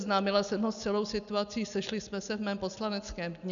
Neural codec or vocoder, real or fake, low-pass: none; real; 7.2 kHz